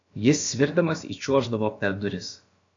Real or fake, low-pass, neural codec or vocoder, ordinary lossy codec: fake; 7.2 kHz; codec, 16 kHz, about 1 kbps, DyCAST, with the encoder's durations; AAC, 48 kbps